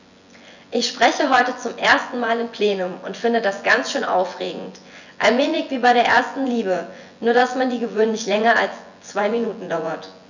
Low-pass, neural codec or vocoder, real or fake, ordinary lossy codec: 7.2 kHz; vocoder, 24 kHz, 100 mel bands, Vocos; fake; none